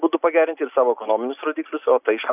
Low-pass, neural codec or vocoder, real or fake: 3.6 kHz; none; real